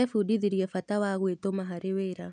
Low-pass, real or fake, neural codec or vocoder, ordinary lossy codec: 9.9 kHz; real; none; none